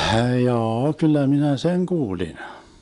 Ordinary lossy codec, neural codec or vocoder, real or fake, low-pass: none; none; real; 10.8 kHz